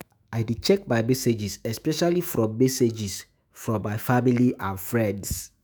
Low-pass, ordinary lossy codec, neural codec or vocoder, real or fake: none; none; autoencoder, 48 kHz, 128 numbers a frame, DAC-VAE, trained on Japanese speech; fake